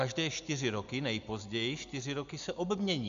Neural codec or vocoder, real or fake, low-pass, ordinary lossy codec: none; real; 7.2 kHz; MP3, 64 kbps